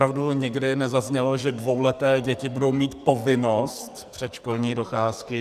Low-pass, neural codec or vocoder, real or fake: 14.4 kHz; codec, 44.1 kHz, 2.6 kbps, SNAC; fake